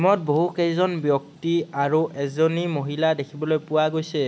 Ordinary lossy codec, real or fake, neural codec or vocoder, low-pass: none; real; none; none